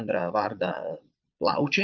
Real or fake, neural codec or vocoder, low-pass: fake; vocoder, 22.05 kHz, 80 mel bands, Vocos; 7.2 kHz